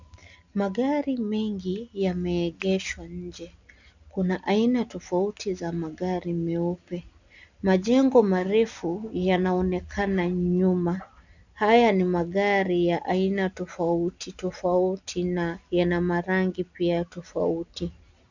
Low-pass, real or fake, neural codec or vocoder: 7.2 kHz; real; none